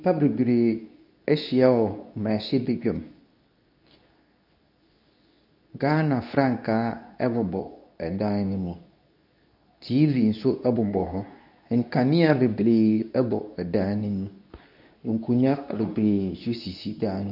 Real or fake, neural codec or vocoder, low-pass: fake; codec, 24 kHz, 0.9 kbps, WavTokenizer, medium speech release version 2; 5.4 kHz